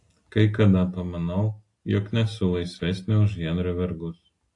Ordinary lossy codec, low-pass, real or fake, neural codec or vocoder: AAC, 48 kbps; 10.8 kHz; real; none